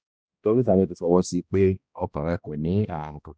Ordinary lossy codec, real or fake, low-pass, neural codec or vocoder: none; fake; none; codec, 16 kHz, 1 kbps, X-Codec, HuBERT features, trained on balanced general audio